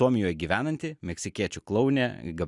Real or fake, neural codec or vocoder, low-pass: real; none; 10.8 kHz